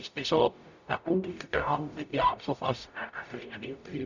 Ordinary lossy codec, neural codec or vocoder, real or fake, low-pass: none; codec, 44.1 kHz, 0.9 kbps, DAC; fake; 7.2 kHz